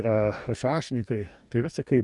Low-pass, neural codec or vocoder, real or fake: 10.8 kHz; codec, 44.1 kHz, 2.6 kbps, DAC; fake